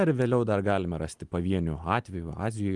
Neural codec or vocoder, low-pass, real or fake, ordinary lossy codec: none; 10.8 kHz; real; Opus, 24 kbps